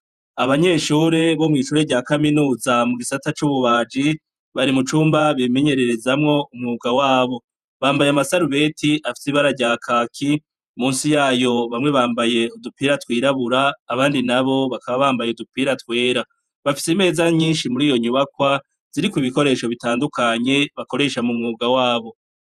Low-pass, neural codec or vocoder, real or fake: 14.4 kHz; vocoder, 48 kHz, 128 mel bands, Vocos; fake